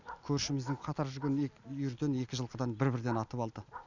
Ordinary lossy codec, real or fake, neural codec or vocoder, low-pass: none; real; none; 7.2 kHz